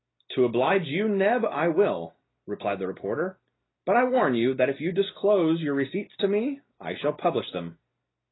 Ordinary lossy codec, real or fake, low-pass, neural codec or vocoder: AAC, 16 kbps; real; 7.2 kHz; none